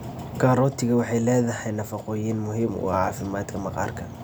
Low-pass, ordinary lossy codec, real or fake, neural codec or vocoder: none; none; real; none